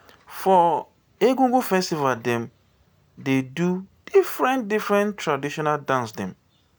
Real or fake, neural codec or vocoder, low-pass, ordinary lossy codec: real; none; none; none